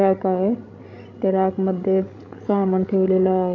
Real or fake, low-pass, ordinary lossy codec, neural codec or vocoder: fake; 7.2 kHz; none; codec, 16 kHz, 16 kbps, FreqCodec, larger model